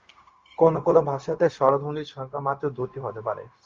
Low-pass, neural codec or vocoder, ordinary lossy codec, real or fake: 7.2 kHz; codec, 16 kHz, 0.4 kbps, LongCat-Audio-Codec; Opus, 32 kbps; fake